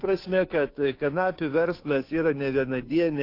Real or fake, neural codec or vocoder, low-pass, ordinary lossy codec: fake; codec, 16 kHz, 2 kbps, FunCodec, trained on Chinese and English, 25 frames a second; 5.4 kHz; AAC, 32 kbps